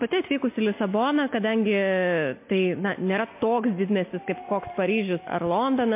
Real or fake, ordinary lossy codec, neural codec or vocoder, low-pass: real; MP3, 24 kbps; none; 3.6 kHz